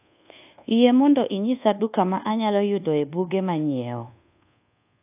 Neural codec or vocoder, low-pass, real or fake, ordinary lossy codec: codec, 24 kHz, 1.2 kbps, DualCodec; 3.6 kHz; fake; AAC, 32 kbps